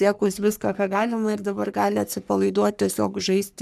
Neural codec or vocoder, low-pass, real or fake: codec, 44.1 kHz, 3.4 kbps, Pupu-Codec; 14.4 kHz; fake